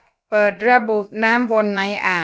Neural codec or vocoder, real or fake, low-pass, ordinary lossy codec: codec, 16 kHz, about 1 kbps, DyCAST, with the encoder's durations; fake; none; none